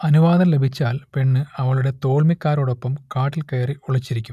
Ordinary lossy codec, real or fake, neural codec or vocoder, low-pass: none; real; none; 14.4 kHz